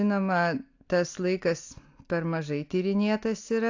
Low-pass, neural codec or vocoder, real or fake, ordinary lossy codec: 7.2 kHz; none; real; AAC, 48 kbps